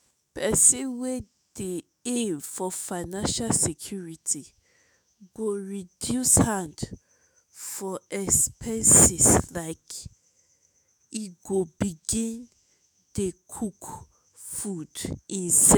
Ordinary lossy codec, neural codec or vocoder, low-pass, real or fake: none; autoencoder, 48 kHz, 128 numbers a frame, DAC-VAE, trained on Japanese speech; none; fake